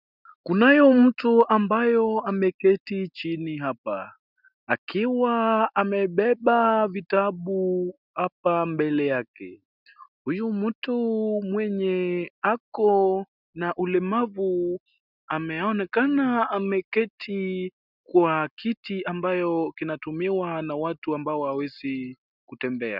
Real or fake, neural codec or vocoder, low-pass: real; none; 5.4 kHz